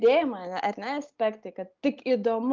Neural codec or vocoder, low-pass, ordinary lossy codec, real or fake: none; 7.2 kHz; Opus, 24 kbps; real